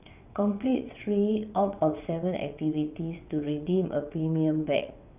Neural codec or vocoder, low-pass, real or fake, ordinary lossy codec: vocoder, 22.05 kHz, 80 mel bands, WaveNeXt; 3.6 kHz; fake; none